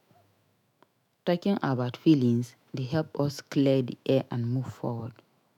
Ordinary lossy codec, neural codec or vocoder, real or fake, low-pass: none; autoencoder, 48 kHz, 128 numbers a frame, DAC-VAE, trained on Japanese speech; fake; 19.8 kHz